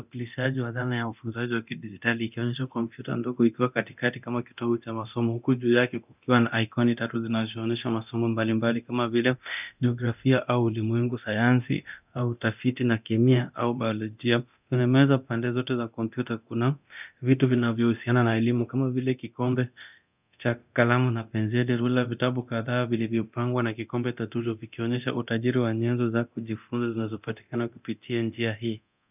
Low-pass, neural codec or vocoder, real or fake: 3.6 kHz; codec, 24 kHz, 0.9 kbps, DualCodec; fake